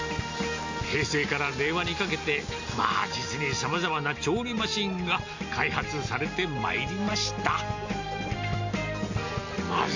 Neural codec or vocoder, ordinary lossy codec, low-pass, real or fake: none; AAC, 48 kbps; 7.2 kHz; real